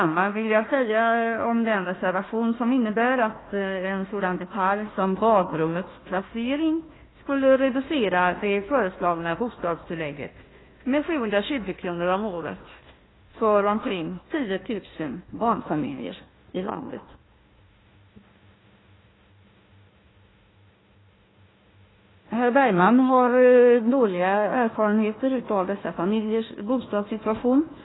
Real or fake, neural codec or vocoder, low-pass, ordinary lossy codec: fake; codec, 16 kHz, 1 kbps, FunCodec, trained on Chinese and English, 50 frames a second; 7.2 kHz; AAC, 16 kbps